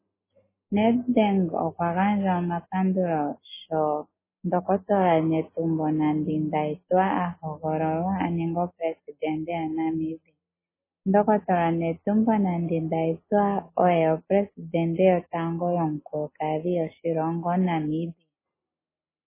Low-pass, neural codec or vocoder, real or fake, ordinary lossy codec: 3.6 kHz; none; real; MP3, 16 kbps